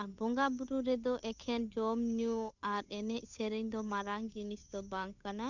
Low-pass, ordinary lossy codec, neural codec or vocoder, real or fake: 7.2 kHz; none; codec, 16 kHz, 8 kbps, FunCodec, trained on Chinese and English, 25 frames a second; fake